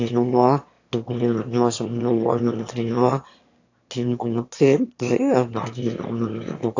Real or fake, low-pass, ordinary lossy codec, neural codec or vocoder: fake; 7.2 kHz; AAC, 48 kbps; autoencoder, 22.05 kHz, a latent of 192 numbers a frame, VITS, trained on one speaker